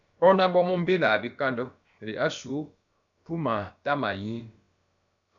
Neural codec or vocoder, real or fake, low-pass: codec, 16 kHz, about 1 kbps, DyCAST, with the encoder's durations; fake; 7.2 kHz